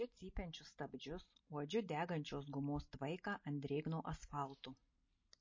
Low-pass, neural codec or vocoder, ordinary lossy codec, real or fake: 7.2 kHz; none; MP3, 32 kbps; real